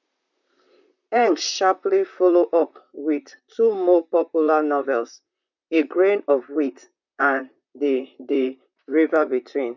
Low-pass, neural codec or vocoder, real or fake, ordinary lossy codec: 7.2 kHz; vocoder, 44.1 kHz, 128 mel bands, Pupu-Vocoder; fake; none